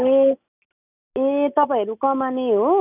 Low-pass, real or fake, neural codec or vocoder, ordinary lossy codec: 3.6 kHz; real; none; none